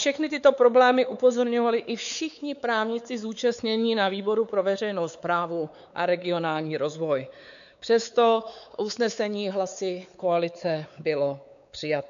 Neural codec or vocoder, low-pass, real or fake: codec, 16 kHz, 4 kbps, X-Codec, WavLM features, trained on Multilingual LibriSpeech; 7.2 kHz; fake